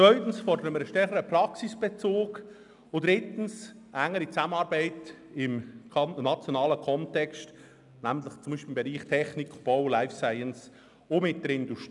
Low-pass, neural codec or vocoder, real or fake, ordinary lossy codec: 10.8 kHz; none; real; none